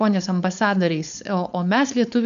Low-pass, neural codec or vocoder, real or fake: 7.2 kHz; codec, 16 kHz, 4.8 kbps, FACodec; fake